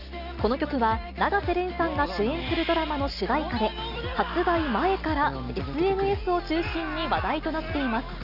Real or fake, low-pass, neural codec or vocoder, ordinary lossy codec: real; 5.4 kHz; none; none